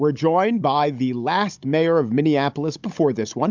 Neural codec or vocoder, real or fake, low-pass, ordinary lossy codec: codec, 16 kHz, 16 kbps, FunCodec, trained on Chinese and English, 50 frames a second; fake; 7.2 kHz; MP3, 64 kbps